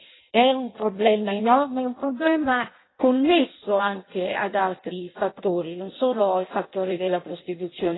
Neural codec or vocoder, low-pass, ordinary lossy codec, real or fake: codec, 16 kHz in and 24 kHz out, 0.6 kbps, FireRedTTS-2 codec; 7.2 kHz; AAC, 16 kbps; fake